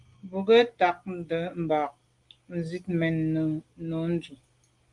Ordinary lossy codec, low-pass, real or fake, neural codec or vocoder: Opus, 24 kbps; 10.8 kHz; real; none